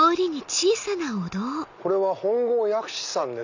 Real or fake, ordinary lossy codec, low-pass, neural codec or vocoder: real; none; 7.2 kHz; none